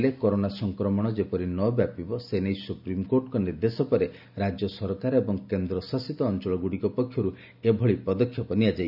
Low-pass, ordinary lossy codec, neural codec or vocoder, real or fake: 5.4 kHz; none; none; real